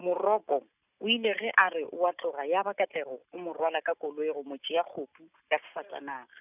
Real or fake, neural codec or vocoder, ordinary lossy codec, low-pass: real; none; none; 3.6 kHz